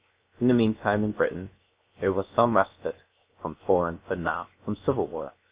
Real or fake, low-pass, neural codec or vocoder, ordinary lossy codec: fake; 3.6 kHz; codec, 16 kHz, 0.7 kbps, FocalCodec; Opus, 64 kbps